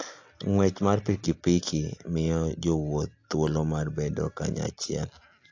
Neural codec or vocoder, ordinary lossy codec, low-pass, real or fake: none; AAC, 48 kbps; 7.2 kHz; real